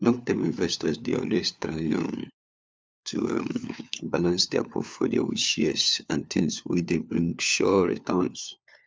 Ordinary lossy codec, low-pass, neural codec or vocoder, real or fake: none; none; codec, 16 kHz, 4 kbps, FunCodec, trained on LibriTTS, 50 frames a second; fake